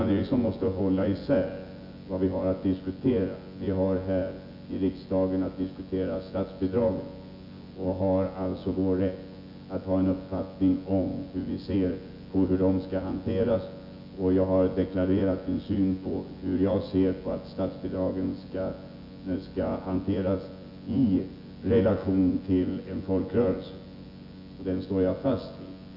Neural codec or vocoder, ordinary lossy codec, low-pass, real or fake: vocoder, 24 kHz, 100 mel bands, Vocos; Opus, 64 kbps; 5.4 kHz; fake